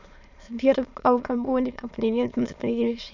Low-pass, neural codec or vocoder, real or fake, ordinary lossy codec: 7.2 kHz; autoencoder, 22.05 kHz, a latent of 192 numbers a frame, VITS, trained on many speakers; fake; none